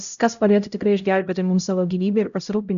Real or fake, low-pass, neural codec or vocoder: fake; 7.2 kHz; codec, 16 kHz, 0.5 kbps, X-Codec, HuBERT features, trained on LibriSpeech